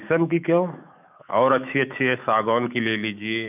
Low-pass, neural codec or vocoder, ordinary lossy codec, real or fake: 3.6 kHz; autoencoder, 48 kHz, 128 numbers a frame, DAC-VAE, trained on Japanese speech; none; fake